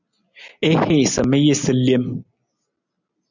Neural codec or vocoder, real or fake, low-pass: none; real; 7.2 kHz